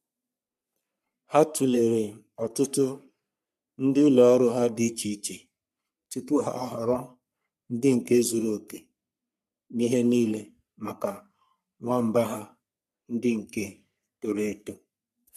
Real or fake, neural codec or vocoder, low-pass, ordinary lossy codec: fake; codec, 44.1 kHz, 3.4 kbps, Pupu-Codec; 14.4 kHz; MP3, 96 kbps